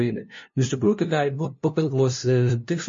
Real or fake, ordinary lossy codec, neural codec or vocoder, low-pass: fake; MP3, 32 kbps; codec, 16 kHz, 0.5 kbps, FunCodec, trained on LibriTTS, 25 frames a second; 7.2 kHz